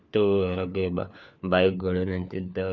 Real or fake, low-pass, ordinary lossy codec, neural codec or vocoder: fake; 7.2 kHz; none; codec, 16 kHz, 4 kbps, FunCodec, trained on LibriTTS, 50 frames a second